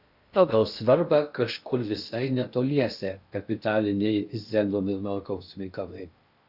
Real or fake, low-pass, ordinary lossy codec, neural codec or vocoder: fake; 5.4 kHz; AAC, 48 kbps; codec, 16 kHz in and 24 kHz out, 0.6 kbps, FocalCodec, streaming, 2048 codes